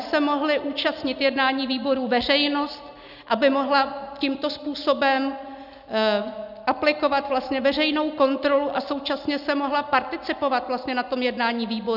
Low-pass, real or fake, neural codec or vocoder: 5.4 kHz; real; none